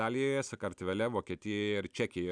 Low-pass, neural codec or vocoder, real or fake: 9.9 kHz; none; real